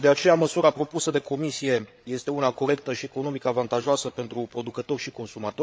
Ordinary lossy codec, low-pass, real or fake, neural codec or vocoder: none; none; fake; codec, 16 kHz, 8 kbps, FreqCodec, larger model